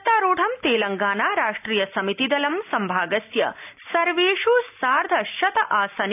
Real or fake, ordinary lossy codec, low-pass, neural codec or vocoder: real; none; 3.6 kHz; none